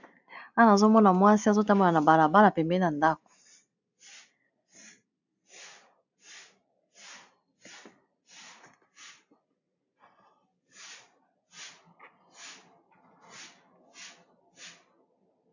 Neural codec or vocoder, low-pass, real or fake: none; 7.2 kHz; real